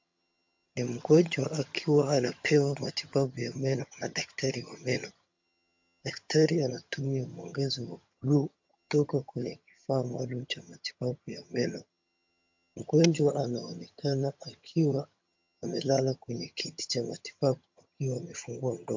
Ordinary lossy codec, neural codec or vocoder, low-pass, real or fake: MP3, 48 kbps; vocoder, 22.05 kHz, 80 mel bands, HiFi-GAN; 7.2 kHz; fake